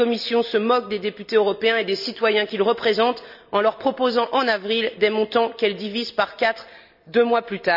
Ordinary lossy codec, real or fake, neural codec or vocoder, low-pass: none; real; none; 5.4 kHz